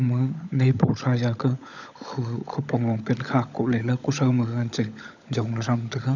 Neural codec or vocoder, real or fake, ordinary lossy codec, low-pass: codec, 16 kHz, 16 kbps, FunCodec, trained on LibriTTS, 50 frames a second; fake; none; 7.2 kHz